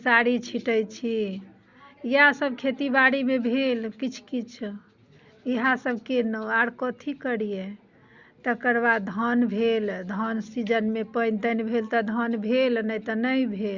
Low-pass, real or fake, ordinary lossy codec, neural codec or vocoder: 7.2 kHz; real; Opus, 64 kbps; none